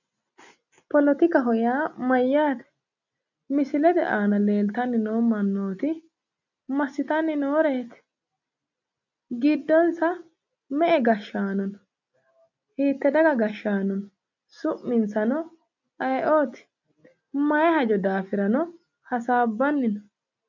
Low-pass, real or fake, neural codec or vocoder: 7.2 kHz; real; none